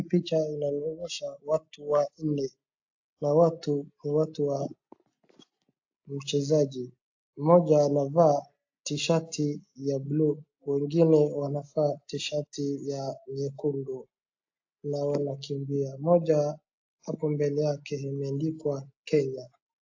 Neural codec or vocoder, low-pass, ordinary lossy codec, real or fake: none; 7.2 kHz; AAC, 48 kbps; real